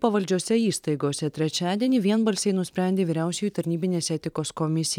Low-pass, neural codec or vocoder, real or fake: 19.8 kHz; vocoder, 44.1 kHz, 128 mel bands every 512 samples, BigVGAN v2; fake